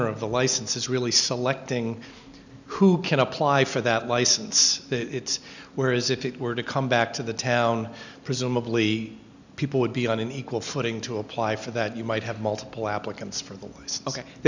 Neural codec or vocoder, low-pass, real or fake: none; 7.2 kHz; real